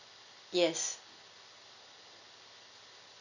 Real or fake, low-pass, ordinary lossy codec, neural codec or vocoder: real; 7.2 kHz; none; none